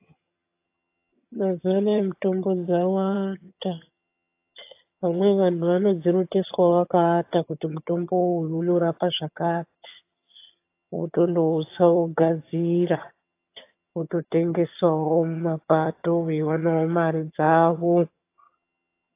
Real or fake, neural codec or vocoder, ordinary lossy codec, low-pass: fake; vocoder, 22.05 kHz, 80 mel bands, HiFi-GAN; AAC, 24 kbps; 3.6 kHz